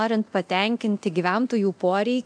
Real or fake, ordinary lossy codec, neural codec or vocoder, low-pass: fake; MP3, 64 kbps; codec, 24 kHz, 0.9 kbps, DualCodec; 9.9 kHz